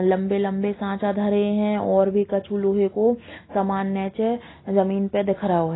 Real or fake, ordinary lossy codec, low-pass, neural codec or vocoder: real; AAC, 16 kbps; 7.2 kHz; none